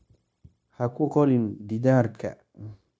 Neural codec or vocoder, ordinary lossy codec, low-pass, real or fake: codec, 16 kHz, 0.9 kbps, LongCat-Audio-Codec; none; none; fake